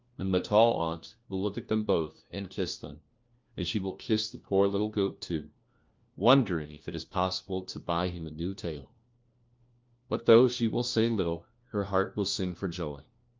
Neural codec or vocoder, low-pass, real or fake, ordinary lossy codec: codec, 16 kHz, 1 kbps, FunCodec, trained on LibriTTS, 50 frames a second; 7.2 kHz; fake; Opus, 24 kbps